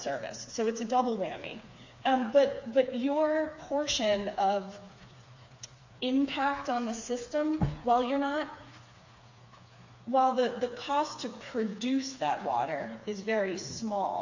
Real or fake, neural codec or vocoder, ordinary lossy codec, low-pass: fake; codec, 16 kHz, 4 kbps, FreqCodec, smaller model; AAC, 48 kbps; 7.2 kHz